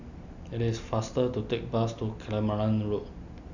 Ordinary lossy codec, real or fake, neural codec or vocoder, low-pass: none; real; none; 7.2 kHz